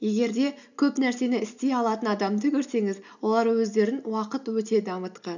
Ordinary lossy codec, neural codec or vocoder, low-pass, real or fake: none; none; 7.2 kHz; real